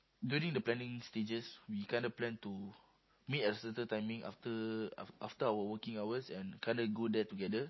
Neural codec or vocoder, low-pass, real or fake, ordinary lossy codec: none; 7.2 kHz; real; MP3, 24 kbps